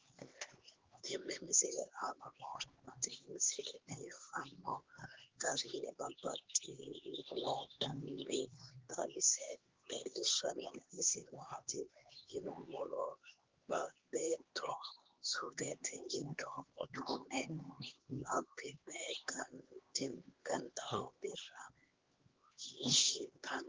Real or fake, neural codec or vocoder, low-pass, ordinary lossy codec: fake; codec, 16 kHz, 2 kbps, X-Codec, HuBERT features, trained on LibriSpeech; 7.2 kHz; Opus, 16 kbps